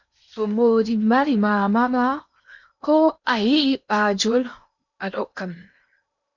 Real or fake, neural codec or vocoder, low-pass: fake; codec, 16 kHz in and 24 kHz out, 0.8 kbps, FocalCodec, streaming, 65536 codes; 7.2 kHz